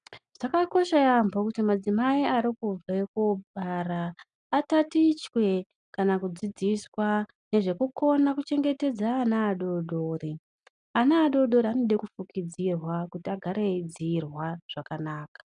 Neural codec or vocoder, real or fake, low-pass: none; real; 9.9 kHz